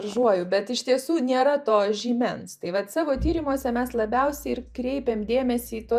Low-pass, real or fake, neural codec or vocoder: 14.4 kHz; fake; vocoder, 48 kHz, 128 mel bands, Vocos